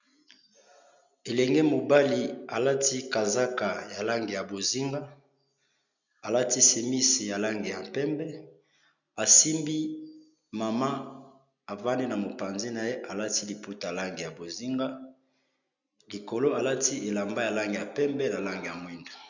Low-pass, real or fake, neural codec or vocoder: 7.2 kHz; real; none